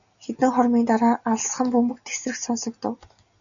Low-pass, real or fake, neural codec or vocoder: 7.2 kHz; real; none